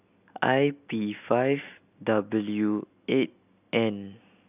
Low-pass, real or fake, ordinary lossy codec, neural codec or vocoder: 3.6 kHz; real; none; none